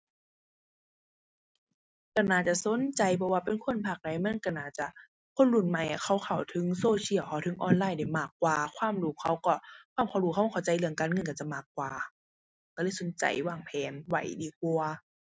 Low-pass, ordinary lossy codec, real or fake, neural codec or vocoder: none; none; real; none